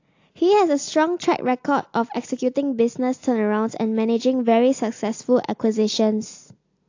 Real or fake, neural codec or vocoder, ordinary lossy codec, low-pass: real; none; AAC, 48 kbps; 7.2 kHz